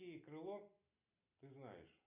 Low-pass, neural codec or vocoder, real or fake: 3.6 kHz; none; real